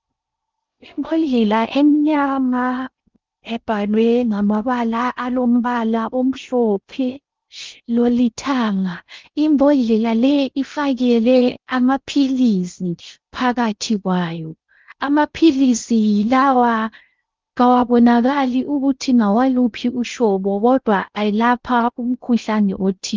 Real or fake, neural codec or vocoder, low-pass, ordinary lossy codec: fake; codec, 16 kHz in and 24 kHz out, 0.6 kbps, FocalCodec, streaming, 4096 codes; 7.2 kHz; Opus, 32 kbps